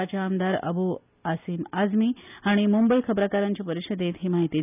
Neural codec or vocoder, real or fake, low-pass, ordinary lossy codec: none; real; 3.6 kHz; none